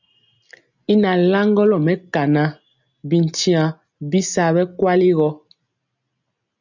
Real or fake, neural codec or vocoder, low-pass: real; none; 7.2 kHz